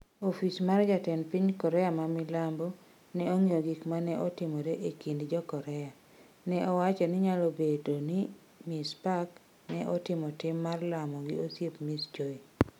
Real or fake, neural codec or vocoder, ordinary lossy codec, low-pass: real; none; none; 19.8 kHz